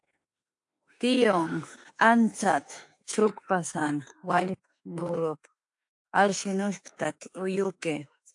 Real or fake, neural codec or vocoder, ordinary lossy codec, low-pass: fake; autoencoder, 48 kHz, 32 numbers a frame, DAC-VAE, trained on Japanese speech; AAC, 64 kbps; 10.8 kHz